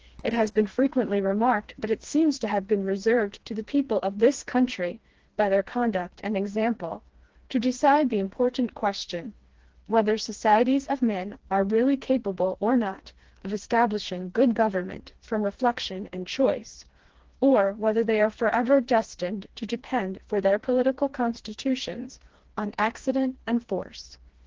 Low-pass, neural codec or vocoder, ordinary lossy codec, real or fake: 7.2 kHz; codec, 16 kHz, 2 kbps, FreqCodec, smaller model; Opus, 16 kbps; fake